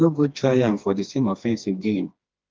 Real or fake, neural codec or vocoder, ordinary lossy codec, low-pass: fake; codec, 16 kHz, 2 kbps, FreqCodec, smaller model; Opus, 32 kbps; 7.2 kHz